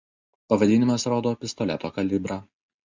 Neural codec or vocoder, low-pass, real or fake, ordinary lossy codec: none; 7.2 kHz; real; MP3, 64 kbps